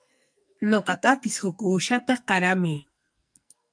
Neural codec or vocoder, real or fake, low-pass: codec, 44.1 kHz, 2.6 kbps, SNAC; fake; 9.9 kHz